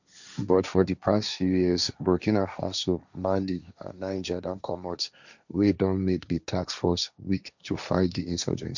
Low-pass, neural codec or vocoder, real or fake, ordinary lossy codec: 7.2 kHz; codec, 16 kHz, 1.1 kbps, Voila-Tokenizer; fake; none